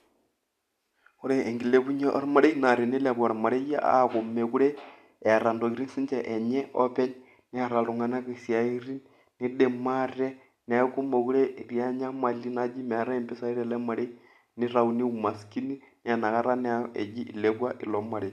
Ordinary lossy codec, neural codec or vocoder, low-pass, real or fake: MP3, 96 kbps; none; 14.4 kHz; real